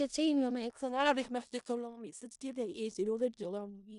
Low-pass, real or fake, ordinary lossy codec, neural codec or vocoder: 10.8 kHz; fake; none; codec, 16 kHz in and 24 kHz out, 0.4 kbps, LongCat-Audio-Codec, four codebook decoder